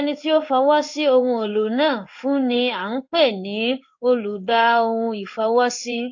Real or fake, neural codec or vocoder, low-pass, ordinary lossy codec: fake; codec, 16 kHz in and 24 kHz out, 1 kbps, XY-Tokenizer; 7.2 kHz; AAC, 48 kbps